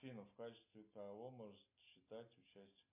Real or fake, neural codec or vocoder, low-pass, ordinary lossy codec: real; none; 3.6 kHz; MP3, 24 kbps